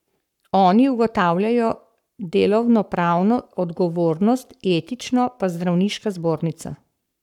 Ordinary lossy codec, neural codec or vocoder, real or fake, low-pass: none; codec, 44.1 kHz, 7.8 kbps, Pupu-Codec; fake; 19.8 kHz